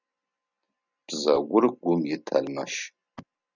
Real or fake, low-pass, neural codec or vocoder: real; 7.2 kHz; none